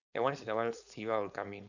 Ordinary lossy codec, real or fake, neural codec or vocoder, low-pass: none; fake; codec, 16 kHz, 4.8 kbps, FACodec; 7.2 kHz